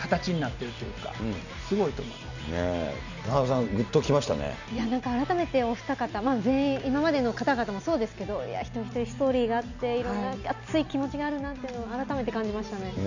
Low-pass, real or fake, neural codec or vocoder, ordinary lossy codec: 7.2 kHz; real; none; none